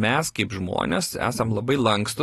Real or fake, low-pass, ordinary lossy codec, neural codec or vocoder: real; 19.8 kHz; AAC, 32 kbps; none